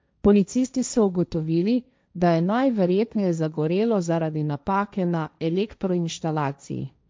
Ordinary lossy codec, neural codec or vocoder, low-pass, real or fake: none; codec, 16 kHz, 1.1 kbps, Voila-Tokenizer; none; fake